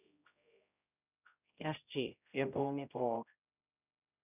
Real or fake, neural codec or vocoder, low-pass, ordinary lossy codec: fake; codec, 16 kHz, 0.5 kbps, X-Codec, HuBERT features, trained on general audio; 3.6 kHz; none